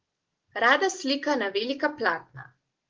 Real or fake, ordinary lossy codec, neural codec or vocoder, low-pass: real; Opus, 16 kbps; none; 7.2 kHz